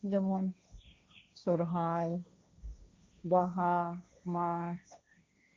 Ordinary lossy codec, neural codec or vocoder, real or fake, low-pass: none; codec, 16 kHz, 1.1 kbps, Voila-Tokenizer; fake; none